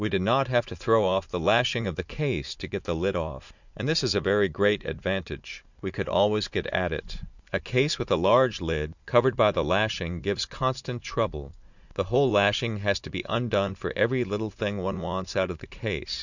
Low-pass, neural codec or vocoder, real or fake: 7.2 kHz; vocoder, 44.1 kHz, 80 mel bands, Vocos; fake